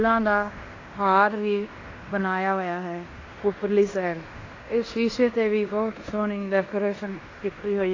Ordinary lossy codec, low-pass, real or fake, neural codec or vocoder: AAC, 32 kbps; 7.2 kHz; fake; codec, 16 kHz in and 24 kHz out, 0.9 kbps, LongCat-Audio-Codec, fine tuned four codebook decoder